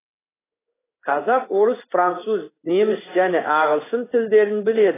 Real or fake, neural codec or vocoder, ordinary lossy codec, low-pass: fake; codec, 16 kHz in and 24 kHz out, 1 kbps, XY-Tokenizer; AAC, 16 kbps; 3.6 kHz